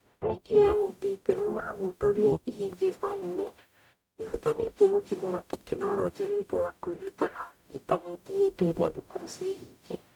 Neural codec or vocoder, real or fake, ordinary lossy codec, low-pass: codec, 44.1 kHz, 0.9 kbps, DAC; fake; none; 19.8 kHz